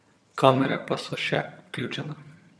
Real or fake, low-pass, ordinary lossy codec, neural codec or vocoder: fake; none; none; vocoder, 22.05 kHz, 80 mel bands, HiFi-GAN